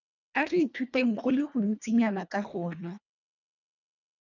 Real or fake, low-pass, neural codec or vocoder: fake; 7.2 kHz; codec, 24 kHz, 1.5 kbps, HILCodec